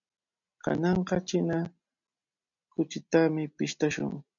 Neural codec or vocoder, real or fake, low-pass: none; real; 7.2 kHz